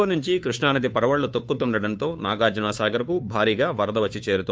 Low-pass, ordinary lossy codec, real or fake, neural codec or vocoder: none; none; fake; codec, 16 kHz, 2 kbps, FunCodec, trained on Chinese and English, 25 frames a second